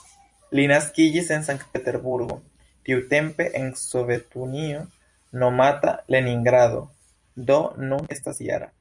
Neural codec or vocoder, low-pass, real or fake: vocoder, 44.1 kHz, 128 mel bands every 256 samples, BigVGAN v2; 10.8 kHz; fake